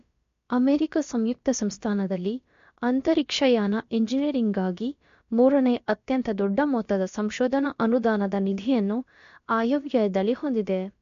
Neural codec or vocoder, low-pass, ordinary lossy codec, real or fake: codec, 16 kHz, about 1 kbps, DyCAST, with the encoder's durations; 7.2 kHz; MP3, 48 kbps; fake